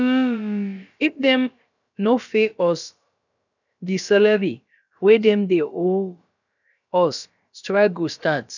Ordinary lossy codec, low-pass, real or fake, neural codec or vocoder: none; 7.2 kHz; fake; codec, 16 kHz, about 1 kbps, DyCAST, with the encoder's durations